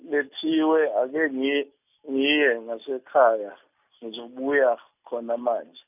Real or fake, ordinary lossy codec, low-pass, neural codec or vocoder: real; none; 3.6 kHz; none